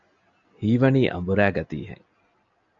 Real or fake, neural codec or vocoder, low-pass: real; none; 7.2 kHz